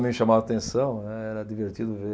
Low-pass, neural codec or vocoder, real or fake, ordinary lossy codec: none; none; real; none